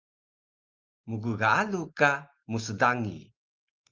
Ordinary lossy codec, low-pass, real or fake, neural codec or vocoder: Opus, 24 kbps; 7.2 kHz; real; none